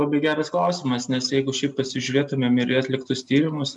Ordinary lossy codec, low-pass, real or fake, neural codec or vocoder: MP3, 96 kbps; 10.8 kHz; real; none